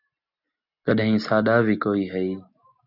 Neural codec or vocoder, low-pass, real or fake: none; 5.4 kHz; real